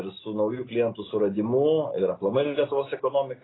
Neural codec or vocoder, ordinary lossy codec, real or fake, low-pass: none; AAC, 16 kbps; real; 7.2 kHz